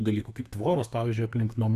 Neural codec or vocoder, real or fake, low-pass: codec, 32 kHz, 1.9 kbps, SNAC; fake; 14.4 kHz